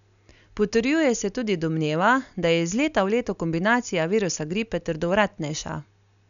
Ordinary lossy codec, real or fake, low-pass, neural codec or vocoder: none; real; 7.2 kHz; none